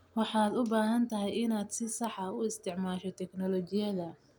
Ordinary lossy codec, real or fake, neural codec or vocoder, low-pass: none; real; none; none